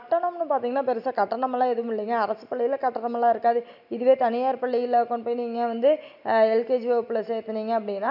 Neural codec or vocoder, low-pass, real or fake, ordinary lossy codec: none; 5.4 kHz; real; none